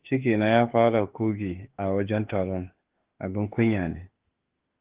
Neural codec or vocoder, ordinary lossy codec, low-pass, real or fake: autoencoder, 48 kHz, 32 numbers a frame, DAC-VAE, trained on Japanese speech; Opus, 16 kbps; 3.6 kHz; fake